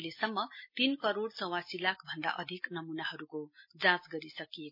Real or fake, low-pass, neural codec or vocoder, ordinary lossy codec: real; 5.4 kHz; none; MP3, 32 kbps